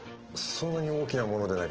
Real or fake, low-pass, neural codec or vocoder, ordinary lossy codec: real; 7.2 kHz; none; Opus, 16 kbps